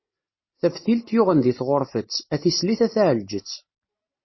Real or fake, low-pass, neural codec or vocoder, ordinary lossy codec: real; 7.2 kHz; none; MP3, 24 kbps